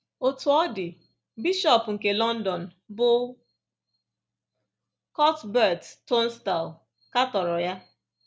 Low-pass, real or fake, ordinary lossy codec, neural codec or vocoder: none; real; none; none